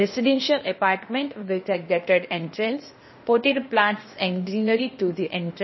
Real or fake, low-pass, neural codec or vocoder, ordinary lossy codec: fake; 7.2 kHz; codec, 16 kHz, 0.8 kbps, ZipCodec; MP3, 24 kbps